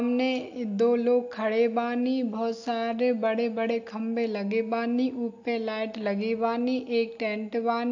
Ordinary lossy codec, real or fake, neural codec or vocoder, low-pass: AAC, 48 kbps; real; none; 7.2 kHz